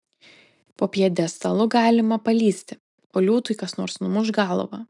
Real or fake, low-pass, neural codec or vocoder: real; 10.8 kHz; none